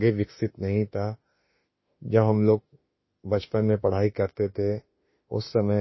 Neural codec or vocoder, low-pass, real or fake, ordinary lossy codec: autoencoder, 48 kHz, 32 numbers a frame, DAC-VAE, trained on Japanese speech; 7.2 kHz; fake; MP3, 24 kbps